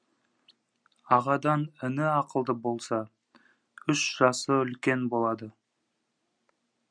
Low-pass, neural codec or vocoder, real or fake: 9.9 kHz; none; real